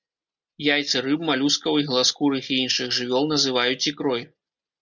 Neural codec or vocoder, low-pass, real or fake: none; 7.2 kHz; real